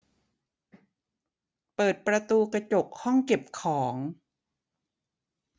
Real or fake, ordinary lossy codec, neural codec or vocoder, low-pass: real; none; none; none